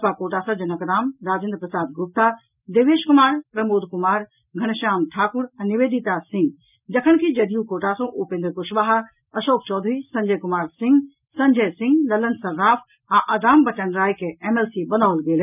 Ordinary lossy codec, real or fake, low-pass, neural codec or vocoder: none; real; 3.6 kHz; none